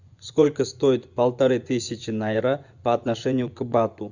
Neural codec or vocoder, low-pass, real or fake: vocoder, 24 kHz, 100 mel bands, Vocos; 7.2 kHz; fake